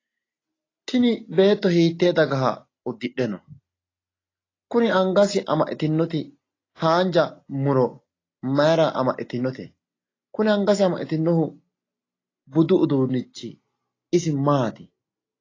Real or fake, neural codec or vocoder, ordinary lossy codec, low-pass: real; none; AAC, 32 kbps; 7.2 kHz